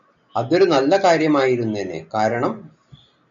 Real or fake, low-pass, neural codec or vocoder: real; 7.2 kHz; none